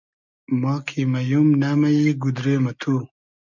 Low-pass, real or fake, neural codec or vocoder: 7.2 kHz; real; none